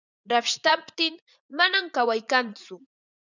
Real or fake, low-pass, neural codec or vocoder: fake; 7.2 kHz; vocoder, 44.1 kHz, 80 mel bands, Vocos